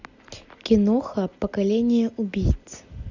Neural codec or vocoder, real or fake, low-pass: none; real; 7.2 kHz